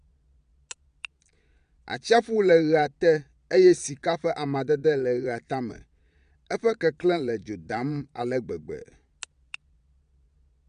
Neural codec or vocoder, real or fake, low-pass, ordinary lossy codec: vocoder, 22.05 kHz, 80 mel bands, Vocos; fake; 9.9 kHz; none